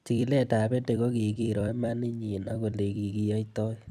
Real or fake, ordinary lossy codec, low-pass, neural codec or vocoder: fake; none; 14.4 kHz; vocoder, 44.1 kHz, 128 mel bands every 256 samples, BigVGAN v2